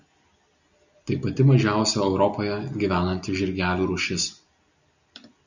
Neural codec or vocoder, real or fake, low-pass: none; real; 7.2 kHz